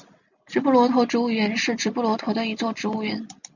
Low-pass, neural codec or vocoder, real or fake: 7.2 kHz; none; real